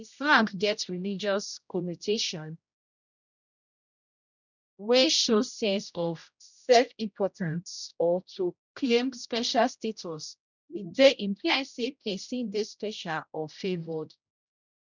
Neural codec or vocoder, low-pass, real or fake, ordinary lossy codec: codec, 16 kHz, 0.5 kbps, X-Codec, HuBERT features, trained on general audio; 7.2 kHz; fake; none